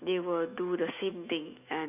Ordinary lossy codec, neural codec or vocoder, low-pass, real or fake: none; autoencoder, 48 kHz, 128 numbers a frame, DAC-VAE, trained on Japanese speech; 3.6 kHz; fake